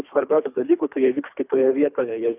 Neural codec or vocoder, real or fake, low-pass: codec, 24 kHz, 3 kbps, HILCodec; fake; 3.6 kHz